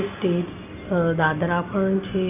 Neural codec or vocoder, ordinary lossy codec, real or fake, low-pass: none; none; real; 3.6 kHz